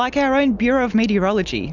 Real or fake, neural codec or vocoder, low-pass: real; none; 7.2 kHz